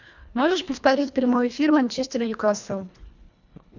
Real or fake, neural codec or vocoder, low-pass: fake; codec, 24 kHz, 1.5 kbps, HILCodec; 7.2 kHz